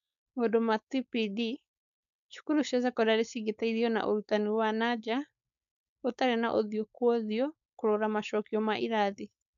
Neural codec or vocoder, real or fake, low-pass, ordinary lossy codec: codec, 16 kHz, 4.8 kbps, FACodec; fake; 7.2 kHz; MP3, 96 kbps